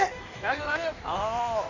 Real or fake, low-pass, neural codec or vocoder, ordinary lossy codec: fake; 7.2 kHz; codec, 16 kHz in and 24 kHz out, 1.1 kbps, FireRedTTS-2 codec; none